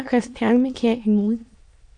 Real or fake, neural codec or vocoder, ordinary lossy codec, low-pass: fake; autoencoder, 22.05 kHz, a latent of 192 numbers a frame, VITS, trained on many speakers; AAC, 64 kbps; 9.9 kHz